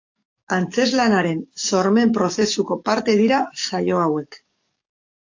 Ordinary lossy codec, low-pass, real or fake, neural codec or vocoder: AAC, 48 kbps; 7.2 kHz; fake; codec, 44.1 kHz, 7.8 kbps, DAC